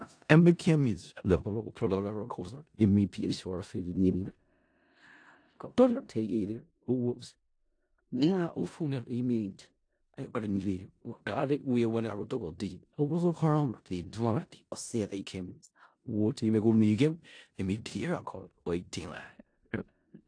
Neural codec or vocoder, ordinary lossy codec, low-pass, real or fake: codec, 16 kHz in and 24 kHz out, 0.4 kbps, LongCat-Audio-Codec, four codebook decoder; AAC, 48 kbps; 9.9 kHz; fake